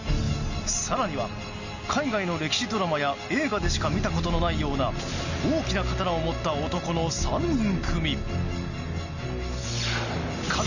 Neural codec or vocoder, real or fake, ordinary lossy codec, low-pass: none; real; none; 7.2 kHz